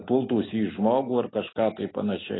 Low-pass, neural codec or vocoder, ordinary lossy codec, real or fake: 7.2 kHz; none; AAC, 16 kbps; real